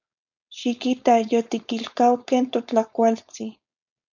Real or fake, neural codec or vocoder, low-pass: fake; codec, 16 kHz, 4.8 kbps, FACodec; 7.2 kHz